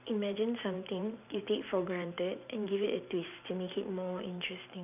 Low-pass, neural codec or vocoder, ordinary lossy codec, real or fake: 3.6 kHz; vocoder, 44.1 kHz, 128 mel bands every 512 samples, BigVGAN v2; none; fake